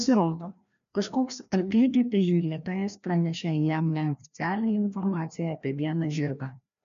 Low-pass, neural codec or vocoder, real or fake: 7.2 kHz; codec, 16 kHz, 1 kbps, FreqCodec, larger model; fake